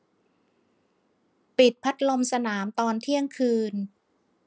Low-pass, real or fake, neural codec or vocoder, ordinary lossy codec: none; real; none; none